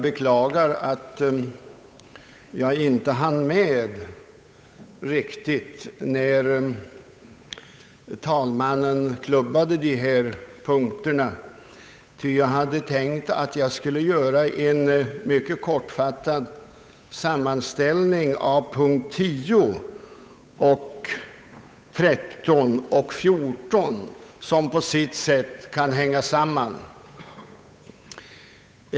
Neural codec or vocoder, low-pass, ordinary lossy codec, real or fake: codec, 16 kHz, 8 kbps, FunCodec, trained on Chinese and English, 25 frames a second; none; none; fake